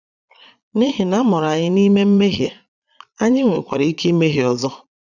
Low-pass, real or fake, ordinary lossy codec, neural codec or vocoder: 7.2 kHz; fake; none; vocoder, 22.05 kHz, 80 mel bands, Vocos